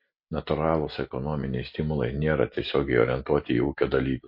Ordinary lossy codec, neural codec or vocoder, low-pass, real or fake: MP3, 32 kbps; none; 5.4 kHz; real